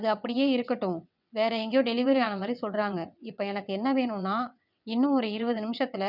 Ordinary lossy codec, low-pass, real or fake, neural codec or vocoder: none; 5.4 kHz; fake; vocoder, 22.05 kHz, 80 mel bands, WaveNeXt